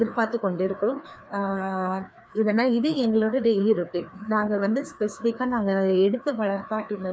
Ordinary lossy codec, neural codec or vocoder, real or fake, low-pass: none; codec, 16 kHz, 2 kbps, FreqCodec, larger model; fake; none